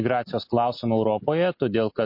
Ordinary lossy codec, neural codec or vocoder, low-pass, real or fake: MP3, 32 kbps; none; 5.4 kHz; real